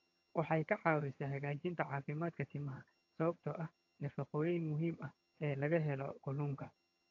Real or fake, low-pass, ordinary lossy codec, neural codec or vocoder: fake; 7.2 kHz; AAC, 48 kbps; vocoder, 22.05 kHz, 80 mel bands, HiFi-GAN